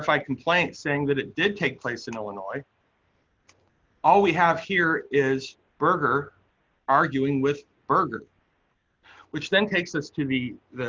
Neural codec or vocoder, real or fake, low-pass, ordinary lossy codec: none; real; 7.2 kHz; Opus, 32 kbps